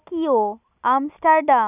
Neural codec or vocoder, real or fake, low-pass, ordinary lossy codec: none; real; 3.6 kHz; none